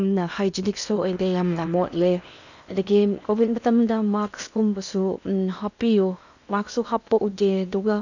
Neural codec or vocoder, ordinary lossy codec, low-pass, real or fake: codec, 16 kHz in and 24 kHz out, 0.8 kbps, FocalCodec, streaming, 65536 codes; none; 7.2 kHz; fake